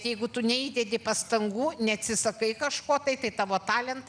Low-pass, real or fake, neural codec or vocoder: 9.9 kHz; real; none